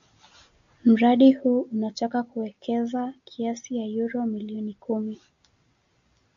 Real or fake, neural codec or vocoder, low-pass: real; none; 7.2 kHz